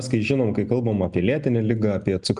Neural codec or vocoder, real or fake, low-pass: none; real; 10.8 kHz